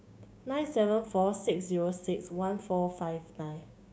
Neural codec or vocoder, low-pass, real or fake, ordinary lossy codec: codec, 16 kHz, 6 kbps, DAC; none; fake; none